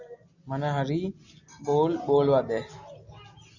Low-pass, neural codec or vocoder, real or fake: 7.2 kHz; none; real